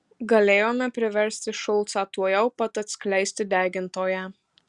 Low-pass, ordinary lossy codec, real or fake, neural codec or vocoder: 10.8 kHz; Opus, 64 kbps; real; none